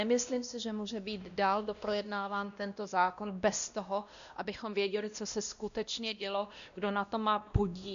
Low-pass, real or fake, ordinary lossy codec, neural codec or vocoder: 7.2 kHz; fake; MP3, 96 kbps; codec, 16 kHz, 1 kbps, X-Codec, WavLM features, trained on Multilingual LibriSpeech